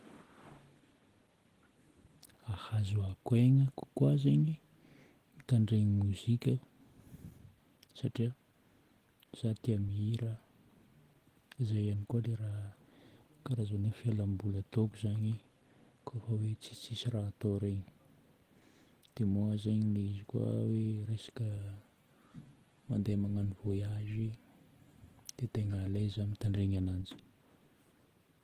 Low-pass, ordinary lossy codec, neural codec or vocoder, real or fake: 14.4 kHz; Opus, 16 kbps; none; real